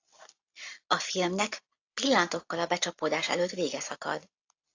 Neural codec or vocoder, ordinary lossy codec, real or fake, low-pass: none; AAC, 48 kbps; real; 7.2 kHz